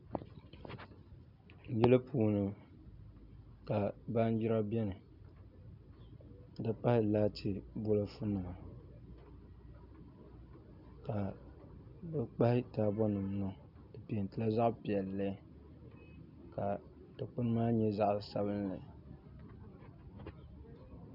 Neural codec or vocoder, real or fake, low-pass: none; real; 5.4 kHz